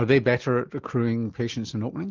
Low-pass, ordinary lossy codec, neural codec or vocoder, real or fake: 7.2 kHz; Opus, 32 kbps; none; real